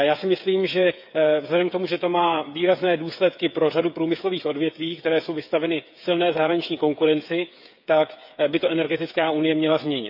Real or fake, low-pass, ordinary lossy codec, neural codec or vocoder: fake; 5.4 kHz; none; codec, 16 kHz, 8 kbps, FreqCodec, smaller model